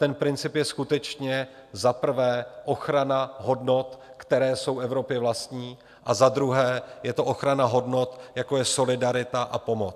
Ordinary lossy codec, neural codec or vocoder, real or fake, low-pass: AAC, 96 kbps; none; real; 14.4 kHz